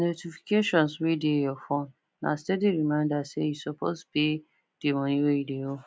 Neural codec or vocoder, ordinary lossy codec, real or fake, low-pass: none; none; real; none